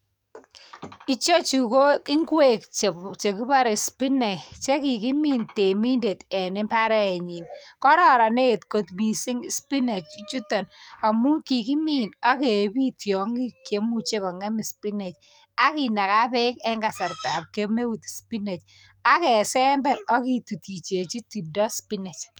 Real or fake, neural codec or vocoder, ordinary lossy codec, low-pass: fake; codec, 44.1 kHz, 7.8 kbps, DAC; none; 19.8 kHz